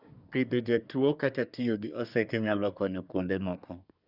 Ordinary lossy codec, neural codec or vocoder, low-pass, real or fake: none; codec, 24 kHz, 1 kbps, SNAC; 5.4 kHz; fake